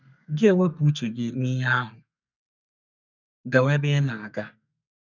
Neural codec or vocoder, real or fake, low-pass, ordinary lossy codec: codec, 32 kHz, 1.9 kbps, SNAC; fake; 7.2 kHz; none